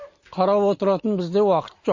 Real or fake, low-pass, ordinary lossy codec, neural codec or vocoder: real; 7.2 kHz; MP3, 32 kbps; none